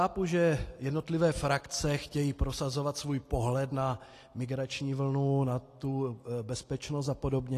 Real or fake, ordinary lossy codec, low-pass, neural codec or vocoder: real; AAC, 48 kbps; 14.4 kHz; none